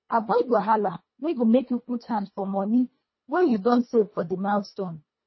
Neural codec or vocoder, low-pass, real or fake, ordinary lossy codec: codec, 24 kHz, 1.5 kbps, HILCodec; 7.2 kHz; fake; MP3, 24 kbps